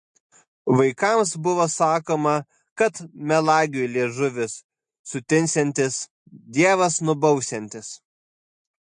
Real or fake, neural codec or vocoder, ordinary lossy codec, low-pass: real; none; MP3, 48 kbps; 10.8 kHz